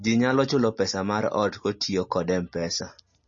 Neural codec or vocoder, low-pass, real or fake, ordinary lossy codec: none; 7.2 kHz; real; MP3, 32 kbps